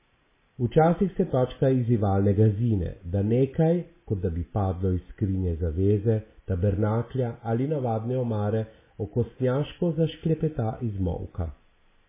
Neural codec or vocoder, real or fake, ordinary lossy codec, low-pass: none; real; MP3, 16 kbps; 3.6 kHz